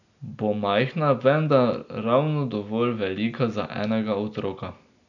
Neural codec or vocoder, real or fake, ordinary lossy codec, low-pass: none; real; none; 7.2 kHz